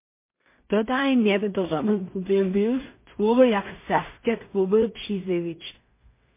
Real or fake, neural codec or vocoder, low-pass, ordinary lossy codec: fake; codec, 16 kHz in and 24 kHz out, 0.4 kbps, LongCat-Audio-Codec, two codebook decoder; 3.6 kHz; MP3, 24 kbps